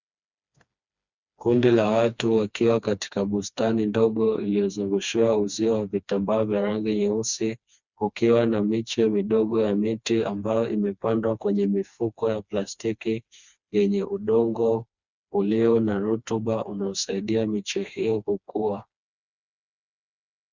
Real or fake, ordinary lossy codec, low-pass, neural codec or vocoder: fake; Opus, 64 kbps; 7.2 kHz; codec, 16 kHz, 2 kbps, FreqCodec, smaller model